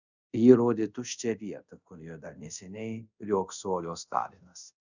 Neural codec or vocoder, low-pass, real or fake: codec, 24 kHz, 0.5 kbps, DualCodec; 7.2 kHz; fake